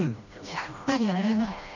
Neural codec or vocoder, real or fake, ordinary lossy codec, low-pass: codec, 16 kHz, 1 kbps, FreqCodec, smaller model; fake; none; 7.2 kHz